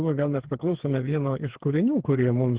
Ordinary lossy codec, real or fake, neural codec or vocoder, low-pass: Opus, 16 kbps; fake; codec, 16 kHz, 4 kbps, FreqCodec, smaller model; 3.6 kHz